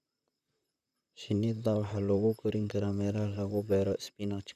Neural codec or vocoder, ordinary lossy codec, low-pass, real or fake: vocoder, 44.1 kHz, 128 mel bands, Pupu-Vocoder; none; 14.4 kHz; fake